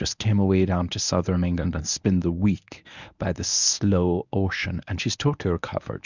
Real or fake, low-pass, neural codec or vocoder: fake; 7.2 kHz; codec, 24 kHz, 0.9 kbps, WavTokenizer, medium speech release version 1